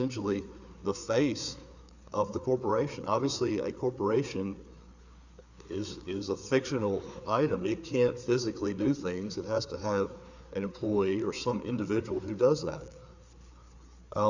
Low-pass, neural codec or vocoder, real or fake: 7.2 kHz; codec, 16 kHz, 4 kbps, FreqCodec, larger model; fake